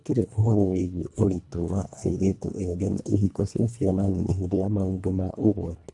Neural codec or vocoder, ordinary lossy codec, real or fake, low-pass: codec, 24 kHz, 1.5 kbps, HILCodec; AAC, 64 kbps; fake; 10.8 kHz